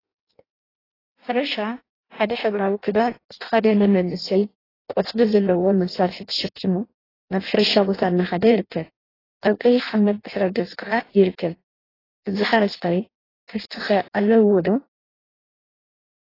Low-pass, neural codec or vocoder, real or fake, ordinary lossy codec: 5.4 kHz; codec, 16 kHz in and 24 kHz out, 0.6 kbps, FireRedTTS-2 codec; fake; AAC, 24 kbps